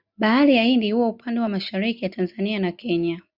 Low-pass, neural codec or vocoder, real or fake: 5.4 kHz; none; real